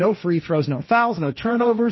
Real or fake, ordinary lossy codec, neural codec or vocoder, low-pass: fake; MP3, 24 kbps; codec, 16 kHz, 1.1 kbps, Voila-Tokenizer; 7.2 kHz